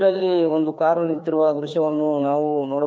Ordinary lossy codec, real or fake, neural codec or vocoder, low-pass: none; fake; codec, 16 kHz, 2 kbps, FreqCodec, larger model; none